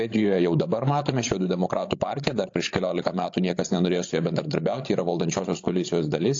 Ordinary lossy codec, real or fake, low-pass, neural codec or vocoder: AAC, 48 kbps; fake; 7.2 kHz; codec, 16 kHz, 16 kbps, FreqCodec, larger model